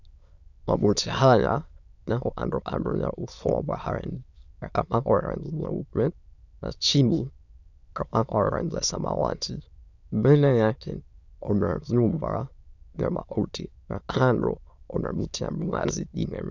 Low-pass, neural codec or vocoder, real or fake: 7.2 kHz; autoencoder, 22.05 kHz, a latent of 192 numbers a frame, VITS, trained on many speakers; fake